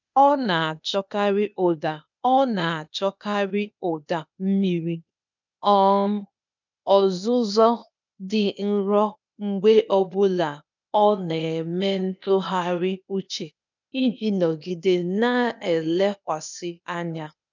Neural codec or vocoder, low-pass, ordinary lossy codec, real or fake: codec, 16 kHz, 0.8 kbps, ZipCodec; 7.2 kHz; none; fake